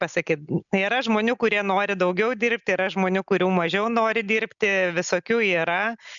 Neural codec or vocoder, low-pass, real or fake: none; 7.2 kHz; real